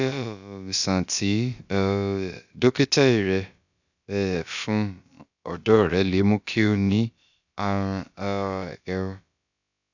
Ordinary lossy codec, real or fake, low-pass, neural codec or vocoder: none; fake; 7.2 kHz; codec, 16 kHz, about 1 kbps, DyCAST, with the encoder's durations